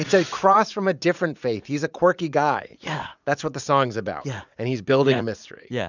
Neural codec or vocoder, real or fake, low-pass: vocoder, 44.1 kHz, 80 mel bands, Vocos; fake; 7.2 kHz